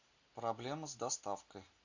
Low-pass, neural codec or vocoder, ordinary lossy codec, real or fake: 7.2 kHz; none; AAC, 48 kbps; real